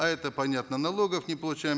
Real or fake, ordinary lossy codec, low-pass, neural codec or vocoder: real; none; none; none